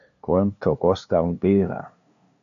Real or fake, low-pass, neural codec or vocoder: fake; 7.2 kHz; codec, 16 kHz, 2 kbps, FunCodec, trained on LibriTTS, 25 frames a second